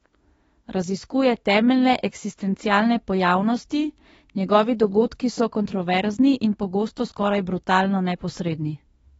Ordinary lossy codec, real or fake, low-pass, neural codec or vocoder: AAC, 24 kbps; fake; 19.8 kHz; autoencoder, 48 kHz, 32 numbers a frame, DAC-VAE, trained on Japanese speech